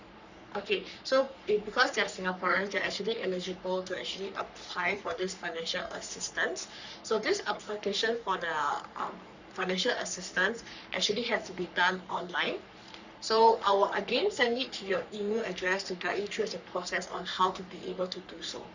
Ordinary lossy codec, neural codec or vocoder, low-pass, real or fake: Opus, 64 kbps; codec, 44.1 kHz, 3.4 kbps, Pupu-Codec; 7.2 kHz; fake